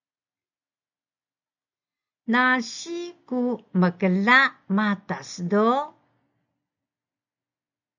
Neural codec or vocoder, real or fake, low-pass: none; real; 7.2 kHz